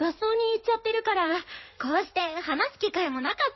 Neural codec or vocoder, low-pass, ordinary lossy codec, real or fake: codec, 16 kHz in and 24 kHz out, 2.2 kbps, FireRedTTS-2 codec; 7.2 kHz; MP3, 24 kbps; fake